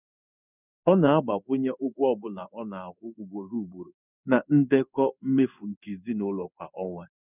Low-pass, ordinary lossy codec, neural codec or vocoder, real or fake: 3.6 kHz; none; codec, 16 kHz in and 24 kHz out, 1 kbps, XY-Tokenizer; fake